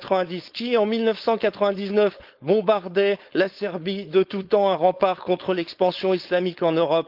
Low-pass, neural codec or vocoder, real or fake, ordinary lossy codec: 5.4 kHz; codec, 16 kHz, 4.8 kbps, FACodec; fake; Opus, 32 kbps